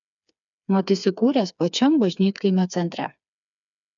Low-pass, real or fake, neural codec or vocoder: 7.2 kHz; fake; codec, 16 kHz, 4 kbps, FreqCodec, smaller model